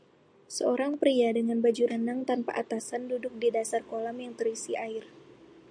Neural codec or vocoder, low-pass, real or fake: none; 9.9 kHz; real